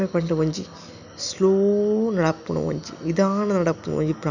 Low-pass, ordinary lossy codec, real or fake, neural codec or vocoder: 7.2 kHz; none; real; none